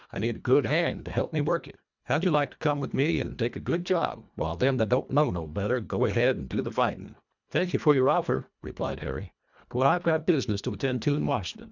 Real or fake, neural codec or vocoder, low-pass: fake; codec, 24 kHz, 1.5 kbps, HILCodec; 7.2 kHz